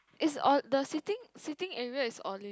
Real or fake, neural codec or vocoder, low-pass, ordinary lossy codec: real; none; none; none